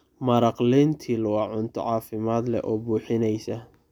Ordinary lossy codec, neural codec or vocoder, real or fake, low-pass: none; none; real; 19.8 kHz